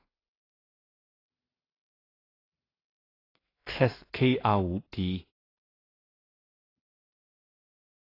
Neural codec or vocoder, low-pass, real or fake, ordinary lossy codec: codec, 16 kHz in and 24 kHz out, 0.4 kbps, LongCat-Audio-Codec, two codebook decoder; 5.4 kHz; fake; AAC, 24 kbps